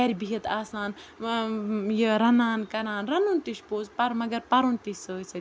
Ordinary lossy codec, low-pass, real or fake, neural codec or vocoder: none; none; real; none